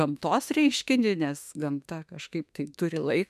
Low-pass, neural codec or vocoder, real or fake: 14.4 kHz; autoencoder, 48 kHz, 32 numbers a frame, DAC-VAE, trained on Japanese speech; fake